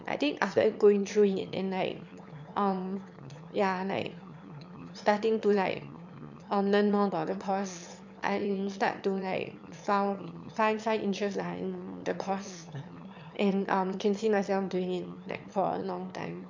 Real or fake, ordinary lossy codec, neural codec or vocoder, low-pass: fake; MP3, 64 kbps; autoencoder, 22.05 kHz, a latent of 192 numbers a frame, VITS, trained on one speaker; 7.2 kHz